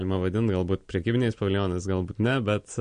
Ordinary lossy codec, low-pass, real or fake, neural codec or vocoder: MP3, 48 kbps; 9.9 kHz; real; none